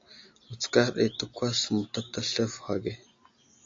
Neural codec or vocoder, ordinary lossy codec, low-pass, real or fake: none; AAC, 48 kbps; 7.2 kHz; real